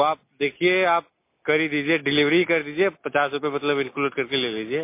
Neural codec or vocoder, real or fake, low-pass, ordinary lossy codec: none; real; 3.6 kHz; MP3, 24 kbps